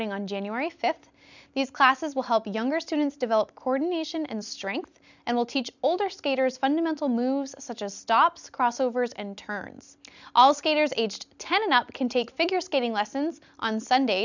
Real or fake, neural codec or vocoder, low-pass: real; none; 7.2 kHz